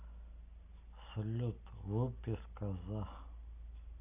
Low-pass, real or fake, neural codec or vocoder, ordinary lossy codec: 3.6 kHz; real; none; AAC, 32 kbps